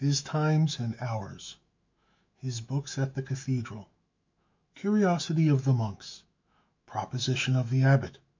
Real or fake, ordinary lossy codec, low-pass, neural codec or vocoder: fake; MP3, 64 kbps; 7.2 kHz; autoencoder, 48 kHz, 128 numbers a frame, DAC-VAE, trained on Japanese speech